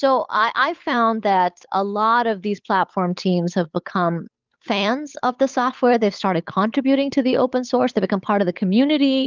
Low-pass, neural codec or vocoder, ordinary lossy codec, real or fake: 7.2 kHz; codec, 16 kHz, 8 kbps, FunCodec, trained on Chinese and English, 25 frames a second; Opus, 24 kbps; fake